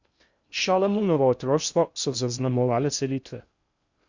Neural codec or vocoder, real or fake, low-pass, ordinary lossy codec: codec, 16 kHz in and 24 kHz out, 0.6 kbps, FocalCodec, streaming, 2048 codes; fake; 7.2 kHz; Opus, 64 kbps